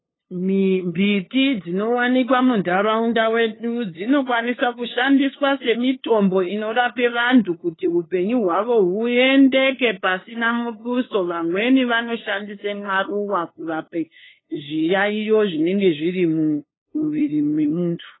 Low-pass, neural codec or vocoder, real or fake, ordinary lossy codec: 7.2 kHz; codec, 16 kHz, 2 kbps, FunCodec, trained on LibriTTS, 25 frames a second; fake; AAC, 16 kbps